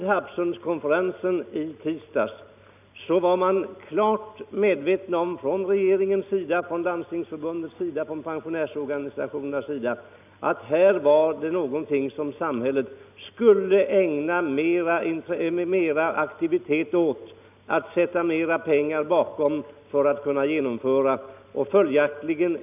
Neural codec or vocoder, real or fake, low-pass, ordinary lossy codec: none; real; 3.6 kHz; none